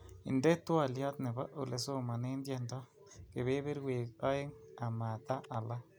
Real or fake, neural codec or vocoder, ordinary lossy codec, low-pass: real; none; none; none